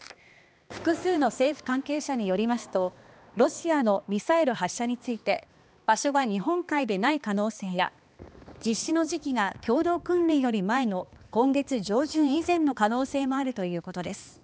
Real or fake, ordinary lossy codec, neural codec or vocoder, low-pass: fake; none; codec, 16 kHz, 2 kbps, X-Codec, HuBERT features, trained on balanced general audio; none